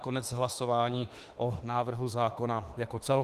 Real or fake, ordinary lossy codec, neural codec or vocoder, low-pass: fake; Opus, 24 kbps; autoencoder, 48 kHz, 32 numbers a frame, DAC-VAE, trained on Japanese speech; 14.4 kHz